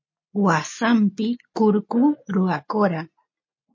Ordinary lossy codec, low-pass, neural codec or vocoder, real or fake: MP3, 32 kbps; 7.2 kHz; none; real